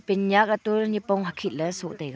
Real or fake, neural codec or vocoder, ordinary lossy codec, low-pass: real; none; none; none